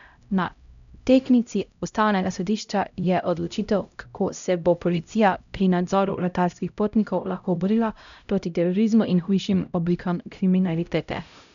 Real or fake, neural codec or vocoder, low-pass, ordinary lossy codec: fake; codec, 16 kHz, 0.5 kbps, X-Codec, HuBERT features, trained on LibriSpeech; 7.2 kHz; none